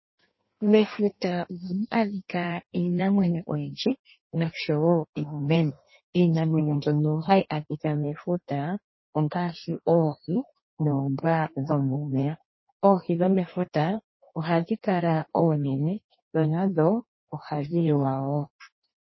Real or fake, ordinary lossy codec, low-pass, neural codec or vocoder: fake; MP3, 24 kbps; 7.2 kHz; codec, 16 kHz in and 24 kHz out, 1.1 kbps, FireRedTTS-2 codec